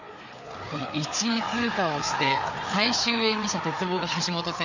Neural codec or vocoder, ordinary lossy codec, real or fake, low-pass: codec, 16 kHz, 4 kbps, FreqCodec, larger model; none; fake; 7.2 kHz